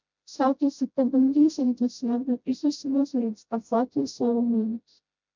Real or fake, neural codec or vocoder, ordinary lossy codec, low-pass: fake; codec, 16 kHz, 0.5 kbps, FreqCodec, smaller model; MP3, 64 kbps; 7.2 kHz